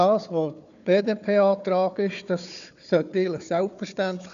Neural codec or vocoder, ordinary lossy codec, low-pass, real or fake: codec, 16 kHz, 4 kbps, FunCodec, trained on Chinese and English, 50 frames a second; none; 7.2 kHz; fake